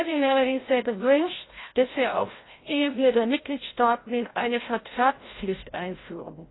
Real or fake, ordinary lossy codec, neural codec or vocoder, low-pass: fake; AAC, 16 kbps; codec, 16 kHz, 0.5 kbps, FreqCodec, larger model; 7.2 kHz